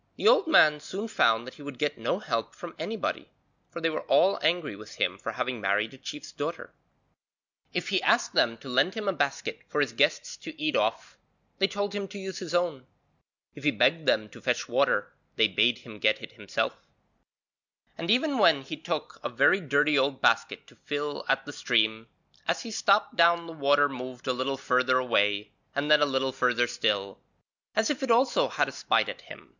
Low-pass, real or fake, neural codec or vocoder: 7.2 kHz; real; none